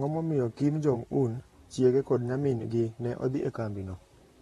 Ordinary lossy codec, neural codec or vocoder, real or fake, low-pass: AAC, 32 kbps; vocoder, 44.1 kHz, 128 mel bands, Pupu-Vocoder; fake; 19.8 kHz